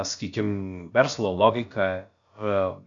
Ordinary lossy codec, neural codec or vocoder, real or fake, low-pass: MP3, 64 kbps; codec, 16 kHz, about 1 kbps, DyCAST, with the encoder's durations; fake; 7.2 kHz